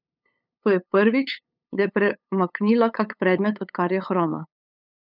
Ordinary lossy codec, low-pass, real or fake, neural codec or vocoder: none; 5.4 kHz; fake; codec, 16 kHz, 8 kbps, FunCodec, trained on LibriTTS, 25 frames a second